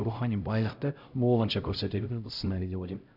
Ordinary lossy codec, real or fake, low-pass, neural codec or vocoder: none; fake; 5.4 kHz; codec, 16 kHz, 0.5 kbps, X-Codec, HuBERT features, trained on LibriSpeech